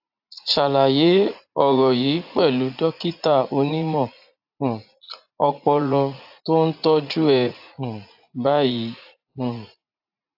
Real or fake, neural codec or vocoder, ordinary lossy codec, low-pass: fake; vocoder, 44.1 kHz, 80 mel bands, Vocos; AAC, 48 kbps; 5.4 kHz